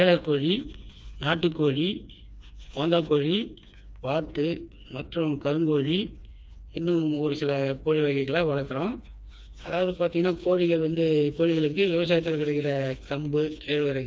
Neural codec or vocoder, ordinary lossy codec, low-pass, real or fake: codec, 16 kHz, 2 kbps, FreqCodec, smaller model; none; none; fake